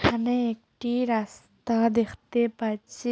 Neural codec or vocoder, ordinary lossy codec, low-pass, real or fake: none; none; none; real